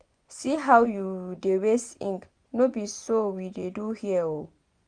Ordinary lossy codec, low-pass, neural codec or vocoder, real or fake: Opus, 64 kbps; 9.9 kHz; vocoder, 24 kHz, 100 mel bands, Vocos; fake